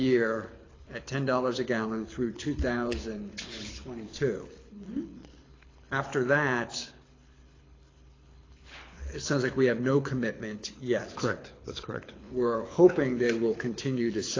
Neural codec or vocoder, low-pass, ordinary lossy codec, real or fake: codec, 24 kHz, 6 kbps, HILCodec; 7.2 kHz; AAC, 32 kbps; fake